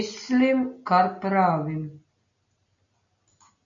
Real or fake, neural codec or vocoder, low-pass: real; none; 7.2 kHz